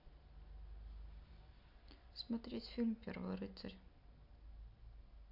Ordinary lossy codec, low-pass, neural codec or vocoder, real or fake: AAC, 48 kbps; 5.4 kHz; none; real